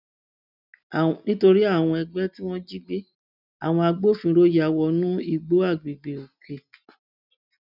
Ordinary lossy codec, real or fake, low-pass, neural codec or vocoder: none; real; 5.4 kHz; none